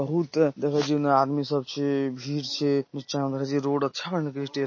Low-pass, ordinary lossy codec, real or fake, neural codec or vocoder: 7.2 kHz; MP3, 32 kbps; real; none